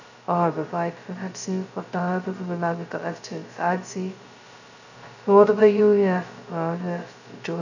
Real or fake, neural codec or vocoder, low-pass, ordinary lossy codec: fake; codec, 16 kHz, 0.2 kbps, FocalCodec; 7.2 kHz; none